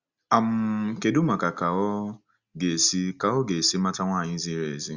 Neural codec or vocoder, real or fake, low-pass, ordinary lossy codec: none; real; 7.2 kHz; Opus, 64 kbps